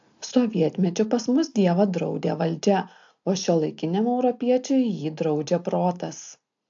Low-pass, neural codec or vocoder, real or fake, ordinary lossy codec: 7.2 kHz; none; real; AAC, 64 kbps